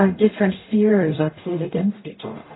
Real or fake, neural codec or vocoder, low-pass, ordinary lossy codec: fake; codec, 44.1 kHz, 0.9 kbps, DAC; 7.2 kHz; AAC, 16 kbps